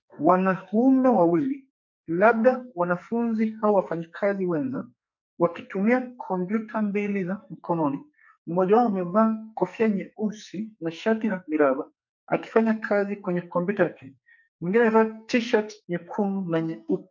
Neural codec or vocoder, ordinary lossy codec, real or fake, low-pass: codec, 44.1 kHz, 2.6 kbps, SNAC; MP3, 48 kbps; fake; 7.2 kHz